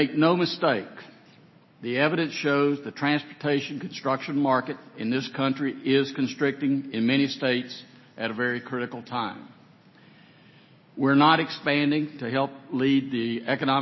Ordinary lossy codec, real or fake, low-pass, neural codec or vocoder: MP3, 24 kbps; real; 7.2 kHz; none